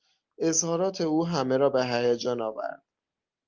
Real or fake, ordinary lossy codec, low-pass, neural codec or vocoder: real; Opus, 24 kbps; 7.2 kHz; none